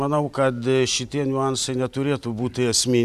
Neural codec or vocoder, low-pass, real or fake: vocoder, 44.1 kHz, 128 mel bands every 512 samples, BigVGAN v2; 14.4 kHz; fake